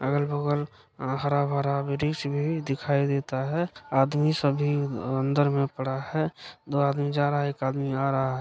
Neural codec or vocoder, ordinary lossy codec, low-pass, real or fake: none; none; none; real